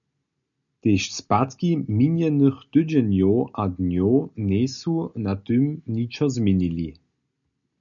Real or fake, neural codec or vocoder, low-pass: real; none; 7.2 kHz